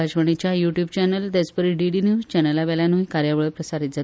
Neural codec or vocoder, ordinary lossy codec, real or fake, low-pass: none; none; real; none